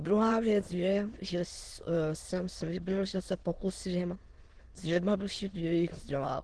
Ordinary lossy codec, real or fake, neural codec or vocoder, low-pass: Opus, 16 kbps; fake; autoencoder, 22.05 kHz, a latent of 192 numbers a frame, VITS, trained on many speakers; 9.9 kHz